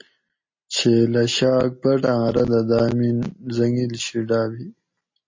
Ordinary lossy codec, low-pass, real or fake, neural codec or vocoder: MP3, 32 kbps; 7.2 kHz; real; none